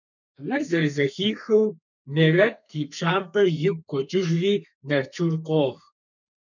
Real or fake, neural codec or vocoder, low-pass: fake; codec, 32 kHz, 1.9 kbps, SNAC; 7.2 kHz